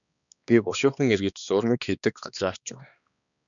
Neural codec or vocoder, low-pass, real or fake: codec, 16 kHz, 2 kbps, X-Codec, HuBERT features, trained on balanced general audio; 7.2 kHz; fake